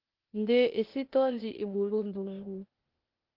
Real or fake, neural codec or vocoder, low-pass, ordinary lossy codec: fake; codec, 16 kHz, 0.8 kbps, ZipCodec; 5.4 kHz; Opus, 16 kbps